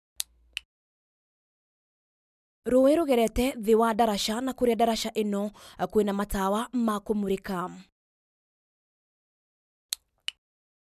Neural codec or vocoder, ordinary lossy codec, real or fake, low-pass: none; none; real; 14.4 kHz